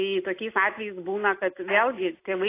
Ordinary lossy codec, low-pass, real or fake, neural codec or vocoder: AAC, 24 kbps; 3.6 kHz; real; none